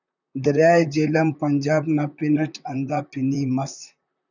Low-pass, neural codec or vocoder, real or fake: 7.2 kHz; vocoder, 44.1 kHz, 128 mel bands, Pupu-Vocoder; fake